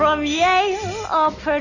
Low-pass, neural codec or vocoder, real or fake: 7.2 kHz; none; real